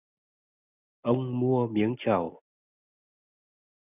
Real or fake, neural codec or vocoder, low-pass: real; none; 3.6 kHz